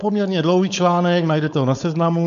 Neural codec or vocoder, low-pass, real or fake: codec, 16 kHz, 4.8 kbps, FACodec; 7.2 kHz; fake